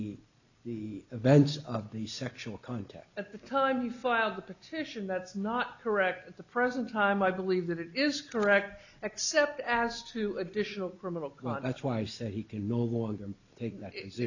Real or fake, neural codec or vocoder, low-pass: real; none; 7.2 kHz